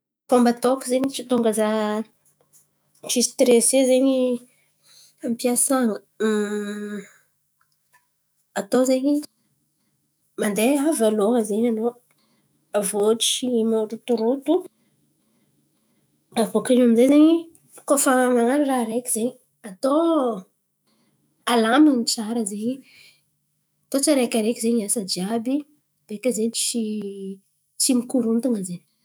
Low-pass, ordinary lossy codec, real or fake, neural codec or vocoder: none; none; fake; autoencoder, 48 kHz, 128 numbers a frame, DAC-VAE, trained on Japanese speech